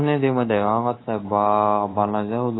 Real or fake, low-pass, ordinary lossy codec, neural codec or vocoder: fake; 7.2 kHz; AAC, 16 kbps; codec, 24 kHz, 3.1 kbps, DualCodec